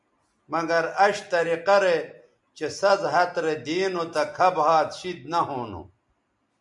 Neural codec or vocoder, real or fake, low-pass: none; real; 10.8 kHz